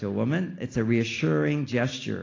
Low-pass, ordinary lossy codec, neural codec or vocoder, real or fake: 7.2 kHz; AAC, 32 kbps; none; real